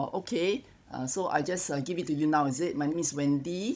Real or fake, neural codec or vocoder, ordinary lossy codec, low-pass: fake; codec, 16 kHz, 16 kbps, FunCodec, trained on Chinese and English, 50 frames a second; none; none